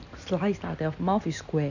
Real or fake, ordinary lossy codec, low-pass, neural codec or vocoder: real; none; 7.2 kHz; none